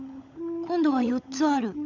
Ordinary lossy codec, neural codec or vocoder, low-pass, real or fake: none; codec, 16 kHz, 16 kbps, FunCodec, trained on LibriTTS, 50 frames a second; 7.2 kHz; fake